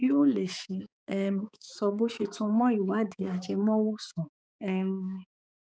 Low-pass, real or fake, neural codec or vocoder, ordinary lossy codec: none; fake; codec, 16 kHz, 4 kbps, X-Codec, HuBERT features, trained on balanced general audio; none